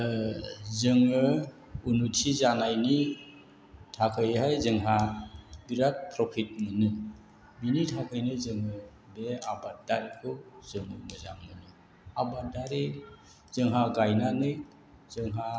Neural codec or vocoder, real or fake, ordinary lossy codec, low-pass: none; real; none; none